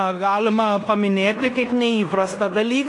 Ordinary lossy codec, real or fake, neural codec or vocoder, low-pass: AAC, 48 kbps; fake; codec, 16 kHz in and 24 kHz out, 0.9 kbps, LongCat-Audio-Codec, fine tuned four codebook decoder; 10.8 kHz